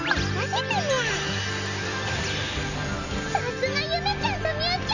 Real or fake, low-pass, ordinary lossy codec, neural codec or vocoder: real; 7.2 kHz; none; none